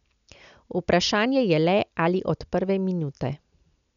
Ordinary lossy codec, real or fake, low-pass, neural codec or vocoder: none; real; 7.2 kHz; none